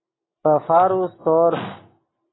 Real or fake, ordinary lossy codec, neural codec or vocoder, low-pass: real; AAC, 16 kbps; none; 7.2 kHz